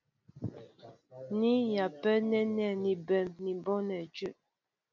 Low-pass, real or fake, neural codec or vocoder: 7.2 kHz; real; none